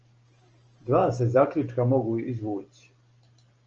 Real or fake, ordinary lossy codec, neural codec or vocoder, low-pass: real; Opus, 24 kbps; none; 7.2 kHz